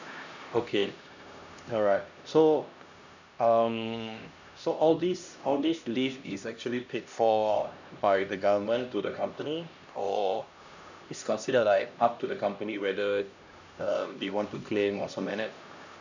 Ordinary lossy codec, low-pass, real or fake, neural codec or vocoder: none; 7.2 kHz; fake; codec, 16 kHz, 1 kbps, X-Codec, HuBERT features, trained on LibriSpeech